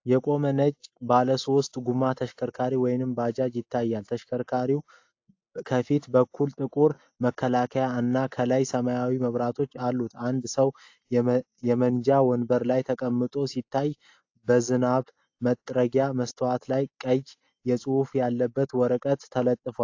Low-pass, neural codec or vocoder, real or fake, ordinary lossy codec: 7.2 kHz; none; real; AAC, 48 kbps